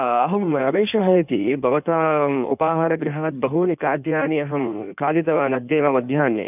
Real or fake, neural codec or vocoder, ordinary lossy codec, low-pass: fake; codec, 16 kHz in and 24 kHz out, 1.1 kbps, FireRedTTS-2 codec; none; 3.6 kHz